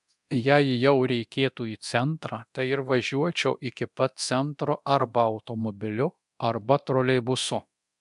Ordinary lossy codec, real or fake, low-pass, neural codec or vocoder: AAC, 96 kbps; fake; 10.8 kHz; codec, 24 kHz, 0.9 kbps, DualCodec